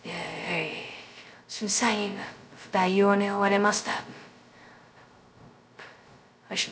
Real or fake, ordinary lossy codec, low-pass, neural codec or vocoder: fake; none; none; codec, 16 kHz, 0.2 kbps, FocalCodec